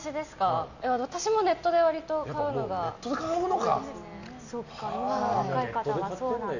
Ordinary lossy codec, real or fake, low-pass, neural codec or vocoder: none; real; 7.2 kHz; none